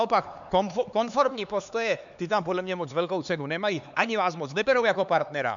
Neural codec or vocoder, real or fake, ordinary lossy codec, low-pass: codec, 16 kHz, 4 kbps, X-Codec, HuBERT features, trained on LibriSpeech; fake; MP3, 96 kbps; 7.2 kHz